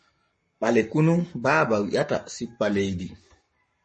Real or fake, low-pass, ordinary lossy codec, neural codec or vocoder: fake; 10.8 kHz; MP3, 32 kbps; codec, 44.1 kHz, 7.8 kbps, Pupu-Codec